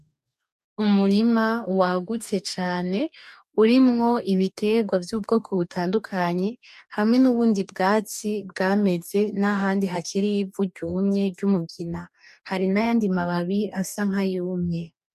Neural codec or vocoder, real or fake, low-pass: codec, 44.1 kHz, 2.6 kbps, DAC; fake; 14.4 kHz